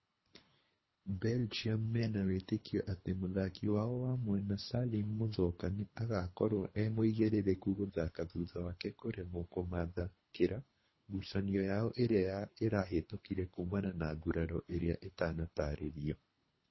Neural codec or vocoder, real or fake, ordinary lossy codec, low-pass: codec, 24 kHz, 3 kbps, HILCodec; fake; MP3, 24 kbps; 7.2 kHz